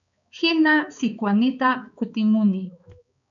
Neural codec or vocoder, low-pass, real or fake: codec, 16 kHz, 4 kbps, X-Codec, HuBERT features, trained on balanced general audio; 7.2 kHz; fake